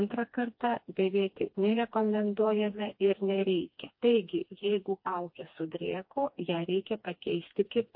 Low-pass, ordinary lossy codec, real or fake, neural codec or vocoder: 5.4 kHz; MP3, 32 kbps; fake; codec, 16 kHz, 2 kbps, FreqCodec, smaller model